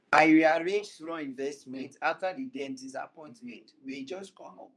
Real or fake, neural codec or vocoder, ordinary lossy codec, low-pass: fake; codec, 24 kHz, 0.9 kbps, WavTokenizer, medium speech release version 2; none; none